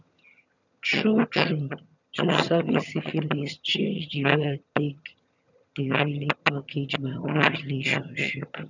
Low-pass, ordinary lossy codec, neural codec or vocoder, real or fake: 7.2 kHz; none; vocoder, 22.05 kHz, 80 mel bands, HiFi-GAN; fake